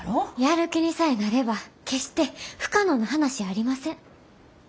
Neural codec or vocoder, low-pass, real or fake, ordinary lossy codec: none; none; real; none